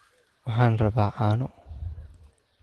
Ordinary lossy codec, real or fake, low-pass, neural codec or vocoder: Opus, 16 kbps; real; 10.8 kHz; none